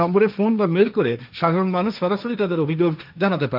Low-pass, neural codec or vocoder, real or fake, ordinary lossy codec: 5.4 kHz; codec, 16 kHz, 1.1 kbps, Voila-Tokenizer; fake; none